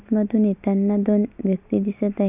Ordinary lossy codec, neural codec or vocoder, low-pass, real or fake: none; none; 3.6 kHz; real